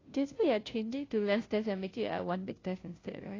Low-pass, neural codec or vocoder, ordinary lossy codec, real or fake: 7.2 kHz; codec, 16 kHz, 0.5 kbps, FunCodec, trained on Chinese and English, 25 frames a second; AAC, 32 kbps; fake